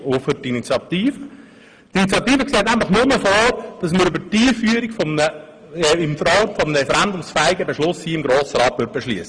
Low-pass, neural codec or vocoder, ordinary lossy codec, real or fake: 9.9 kHz; none; Opus, 24 kbps; real